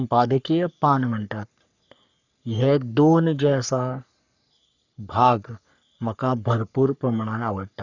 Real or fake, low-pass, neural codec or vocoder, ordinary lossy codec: fake; 7.2 kHz; codec, 44.1 kHz, 3.4 kbps, Pupu-Codec; none